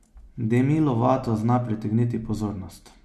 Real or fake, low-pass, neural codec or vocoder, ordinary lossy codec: real; 14.4 kHz; none; MP3, 64 kbps